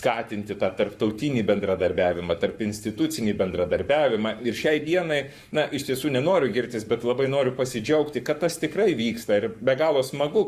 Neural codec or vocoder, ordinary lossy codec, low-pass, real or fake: codec, 44.1 kHz, 7.8 kbps, Pupu-Codec; Opus, 64 kbps; 14.4 kHz; fake